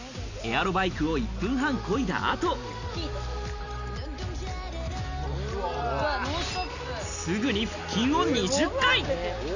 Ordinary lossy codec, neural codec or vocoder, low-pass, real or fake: none; none; 7.2 kHz; real